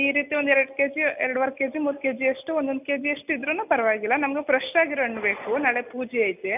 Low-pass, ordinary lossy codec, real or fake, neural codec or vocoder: 3.6 kHz; none; real; none